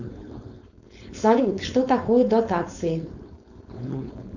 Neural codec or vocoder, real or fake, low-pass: codec, 16 kHz, 4.8 kbps, FACodec; fake; 7.2 kHz